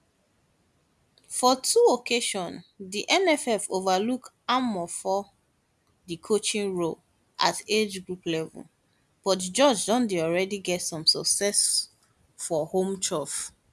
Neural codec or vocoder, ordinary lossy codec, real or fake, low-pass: none; none; real; none